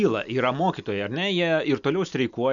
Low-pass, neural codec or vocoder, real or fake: 7.2 kHz; none; real